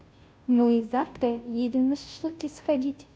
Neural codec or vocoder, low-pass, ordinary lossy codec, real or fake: codec, 16 kHz, 0.5 kbps, FunCodec, trained on Chinese and English, 25 frames a second; none; none; fake